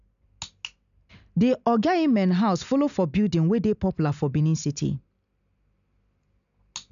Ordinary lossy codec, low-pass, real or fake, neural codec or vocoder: none; 7.2 kHz; real; none